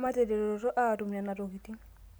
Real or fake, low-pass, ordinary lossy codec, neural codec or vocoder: real; none; none; none